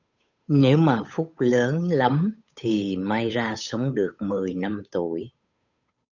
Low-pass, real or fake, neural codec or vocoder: 7.2 kHz; fake; codec, 16 kHz, 8 kbps, FunCodec, trained on Chinese and English, 25 frames a second